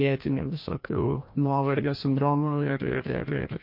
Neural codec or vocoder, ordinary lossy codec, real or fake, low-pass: codec, 16 kHz, 1 kbps, FreqCodec, larger model; MP3, 32 kbps; fake; 5.4 kHz